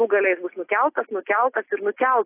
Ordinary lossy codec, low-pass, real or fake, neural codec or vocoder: AAC, 16 kbps; 3.6 kHz; real; none